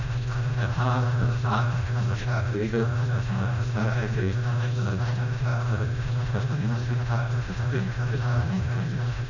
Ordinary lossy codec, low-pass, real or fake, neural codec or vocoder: none; 7.2 kHz; fake; codec, 16 kHz, 0.5 kbps, FreqCodec, smaller model